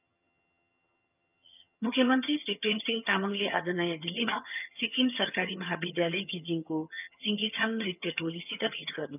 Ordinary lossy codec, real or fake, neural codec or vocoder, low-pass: none; fake; vocoder, 22.05 kHz, 80 mel bands, HiFi-GAN; 3.6 kHz